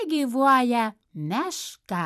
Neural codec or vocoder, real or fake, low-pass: vocoder, 44.1 kHz, 128 mel bands every 256 samples, BigVGAN v2; fake; 14.4 kHz